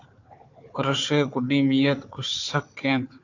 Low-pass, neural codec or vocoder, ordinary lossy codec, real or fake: 7.2 kHz; codec, 16 kHz, 4 kbps, FunCodec, trained on Chinese and English, 50 frames a second; AAC, 48 kbps; fake